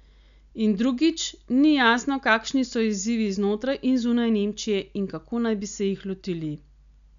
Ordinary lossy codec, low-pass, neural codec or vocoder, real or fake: none; 7.2 kHz; none; real